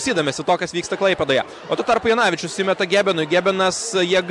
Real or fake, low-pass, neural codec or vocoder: real; 10.8 kHz; none